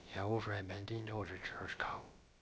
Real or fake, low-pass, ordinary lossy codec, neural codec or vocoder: fake; none; none; codec, 16 kHz, about 1 kbps, DyCAST, with the encoder's durations